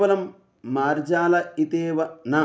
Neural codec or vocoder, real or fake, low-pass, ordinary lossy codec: none; real; none; none